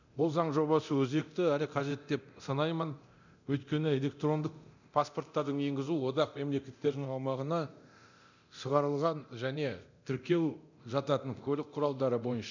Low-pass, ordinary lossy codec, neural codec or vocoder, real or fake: 7.2 kHz; none; codec, 24 kHz, 0.9 kbps, DualCodec; fake